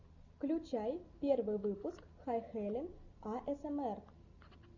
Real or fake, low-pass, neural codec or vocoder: real; 7.2 kHz; none